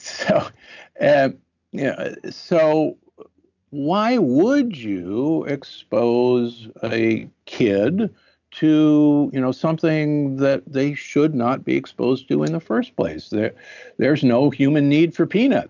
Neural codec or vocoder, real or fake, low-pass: none; real; 7.2 kHz